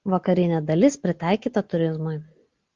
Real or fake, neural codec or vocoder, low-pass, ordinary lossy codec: real; none; 7.2 kHz; Opus, 32 kbps